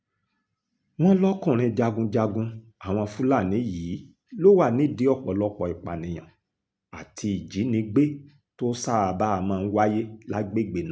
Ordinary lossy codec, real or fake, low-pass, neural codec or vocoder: none; real; none; none